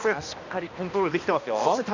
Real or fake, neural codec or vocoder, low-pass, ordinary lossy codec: fake; codec, 16 kHz, 1 kbps, X-Codec, HuBERT features, trained on balanced general audio; 7.2 kHz; none